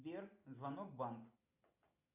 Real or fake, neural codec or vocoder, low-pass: real; none; 3.6 kHz